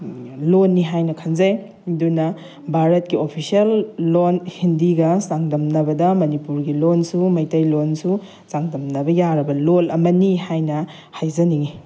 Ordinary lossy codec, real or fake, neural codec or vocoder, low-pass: none; real; none; none